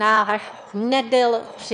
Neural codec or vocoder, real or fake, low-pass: autoencoder, 22.05 kHz, a latent of 192 numbers a frame, VITS, trained on one speaker; fake; 9.9 kHz